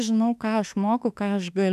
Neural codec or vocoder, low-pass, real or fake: autoencoder, 48 kHz, 32 numbers a frame, DAC-VAE, trained on Japanese speech; 14.4 kHz; fake